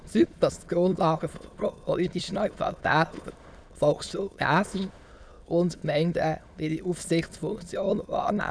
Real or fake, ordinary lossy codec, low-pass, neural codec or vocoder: fake; none; none; autoencoder, 22.05 kHz, a latent of 192 numbers a frame, VITS, trained on many speakers